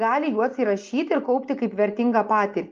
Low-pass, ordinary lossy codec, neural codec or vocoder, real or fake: 7.2 kHz; Opus, 24 kbps; none; real